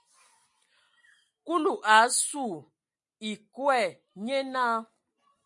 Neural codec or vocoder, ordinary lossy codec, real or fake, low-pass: none; MP3, 48 kbps; real; 10.8 kHz